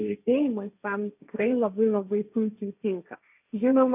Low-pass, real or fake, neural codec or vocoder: 3.6 kHz; fake; codec, 16 kHz, 1.1 kbps, Voila-Tokenizer